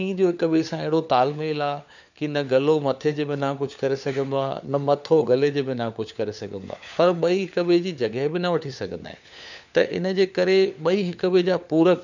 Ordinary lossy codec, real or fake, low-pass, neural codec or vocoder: none; fake; 7.2 kHz; codec, 16 kHz, 4 kbps, FunCodec, trained on LibriTTS, 50 frames a second